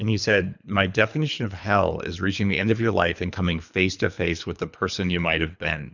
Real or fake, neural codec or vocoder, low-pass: fake; codec, 24 kHz, 3 kbps, HILCodec; 7.2 kHz